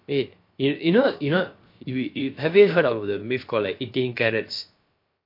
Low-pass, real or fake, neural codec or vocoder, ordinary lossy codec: 5.4 kHz; fake; codec, 16 kHz, about 1 kbps, DyCAST, with the encoder's durations; MP3, 32 kbps